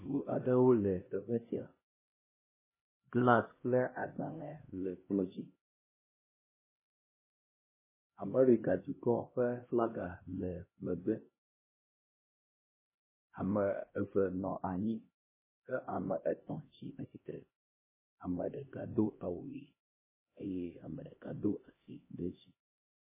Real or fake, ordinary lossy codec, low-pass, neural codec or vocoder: fake; MP3, 16 kbps; 3.6 kHz; codec, 16 kHz, 1 kbps, X-Codec, HuBERT features, trained on LibriSpeech